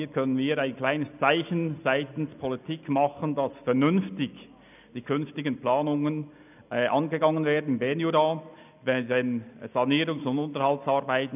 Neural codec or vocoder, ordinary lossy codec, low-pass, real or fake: none; none; 3.6 kHz; real